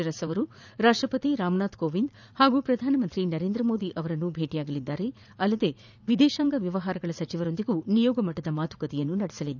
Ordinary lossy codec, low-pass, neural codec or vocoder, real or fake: none; 7.2 kHz; vocoder, 44.1 kHz, 80 mel bands, Vocos; fake